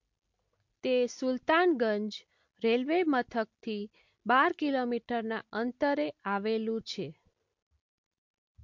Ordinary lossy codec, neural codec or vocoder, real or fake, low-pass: MP3, 48 kbps; none; real; 7.2 kHz